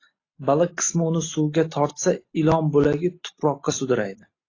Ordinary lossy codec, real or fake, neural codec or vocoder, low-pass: AAC, 32 kbps; real; none; 7.2 kHz